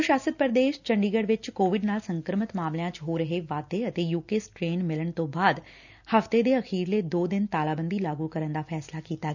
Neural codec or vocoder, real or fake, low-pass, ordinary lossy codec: none; real; 7.2 kHz; none